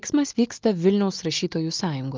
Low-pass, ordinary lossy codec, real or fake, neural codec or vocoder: 7.2 kHz; Opus, 32 kbps; real; none